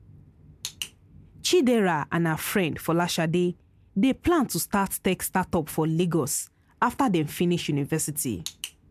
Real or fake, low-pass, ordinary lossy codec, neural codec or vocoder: real; 14.4 kHz; MP3, 96 kbps; none